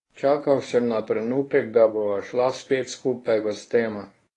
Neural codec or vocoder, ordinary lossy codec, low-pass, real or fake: codec, 24 kHz, 0.9 kbps, WavTokenizer, medium speech release version 1; AAC, 32 kbps; 10.8 kHz; fake